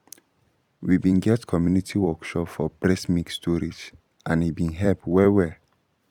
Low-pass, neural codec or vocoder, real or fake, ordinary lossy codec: 19.8 kHz; vocoder, 44.1 kHz, 128 mel bands every 256 samples, BigVGAN v2; fake; none